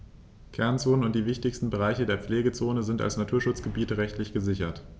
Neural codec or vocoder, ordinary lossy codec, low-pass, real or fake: none; none; none; real